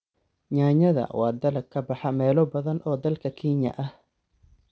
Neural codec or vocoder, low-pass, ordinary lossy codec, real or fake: none; none; none; real